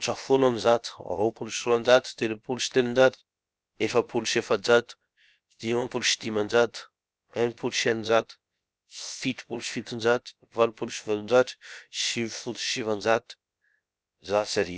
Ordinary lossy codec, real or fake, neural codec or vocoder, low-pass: none; fake; codec, 16 kHz, about 1 kbps, DyCAST, with the encoder's durations; none